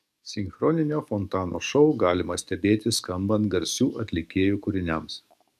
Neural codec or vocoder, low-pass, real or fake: codec, 44.1 kHz, 7.8 kbps, DAC; 14.4 kHz; fake